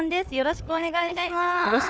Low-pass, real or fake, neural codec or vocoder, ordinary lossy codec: none; fake; codec, 16 kHz, 4 kbps, FunCodec, trained on Chinese and English, 50 frames a second; none